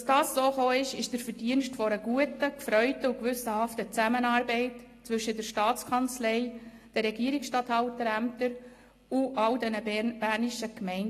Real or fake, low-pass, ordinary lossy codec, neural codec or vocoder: real; 14.4 kHz; AAC, 48 kbps; none